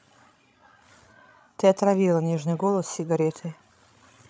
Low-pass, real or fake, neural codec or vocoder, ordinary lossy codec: none; fake; codec, 16 kHz, 16 kbps, FreqCodec, larger model; none